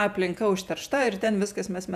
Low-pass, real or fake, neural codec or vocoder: 14.4 kHz; fake; vocoder, 48 kHz, 128 mel bands, Vocos